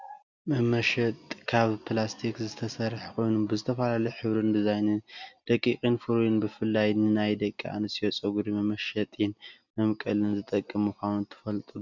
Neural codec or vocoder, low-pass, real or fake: none; 7.2 kHz; real